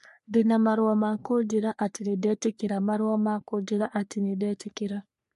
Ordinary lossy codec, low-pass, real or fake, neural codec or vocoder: MP3, 48 kbps; 14.4 kHz; fake; codec, 44.1 kHz, 3.4 kbps, Pupu-Codec